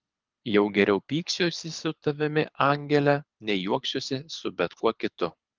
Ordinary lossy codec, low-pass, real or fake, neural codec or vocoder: Opus, 32 kbps; 7.2 kHz; fake; codec, 24 kHz, 6 kbps, HILCodec